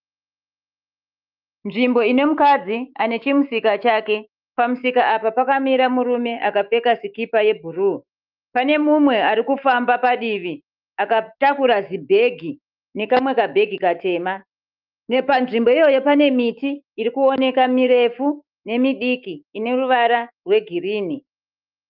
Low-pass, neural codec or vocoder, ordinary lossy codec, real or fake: 5.4 kHz; autoencoder, 48 kHz, 128 numbers a frame, DAC-VAE, trained on Japanese speech; Opus, 24 kbps; fake